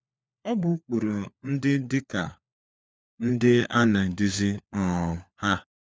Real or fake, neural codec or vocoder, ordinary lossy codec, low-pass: fake; codec, 16 kHz, 4 kbps, FunCodec, trained on LibriTTS, 50 frames a second; none; none